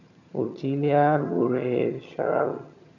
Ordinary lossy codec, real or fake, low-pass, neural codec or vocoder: none; fake; 7.2 kHz; vocoder, 22.05 kHz, 80 mel bands, HiFi-GAN